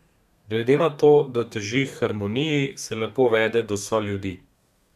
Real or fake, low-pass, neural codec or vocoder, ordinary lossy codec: fake; 14.4 kHz; codec, 32 kHz, 1.9 kbps, SNAC; none